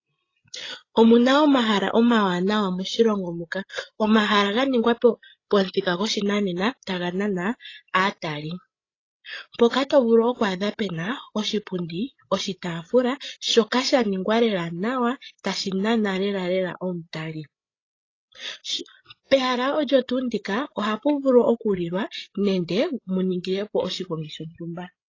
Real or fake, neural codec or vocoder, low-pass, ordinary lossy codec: fake; codec, 16 kHz, 16 kbps, FreqCodec, larger model; 7.2 kHz; AAC, 32 kbps